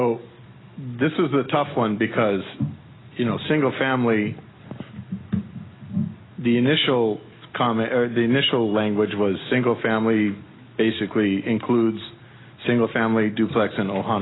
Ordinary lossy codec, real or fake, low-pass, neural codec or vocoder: AAC, 16 kbps; real; 7.2 kHz; none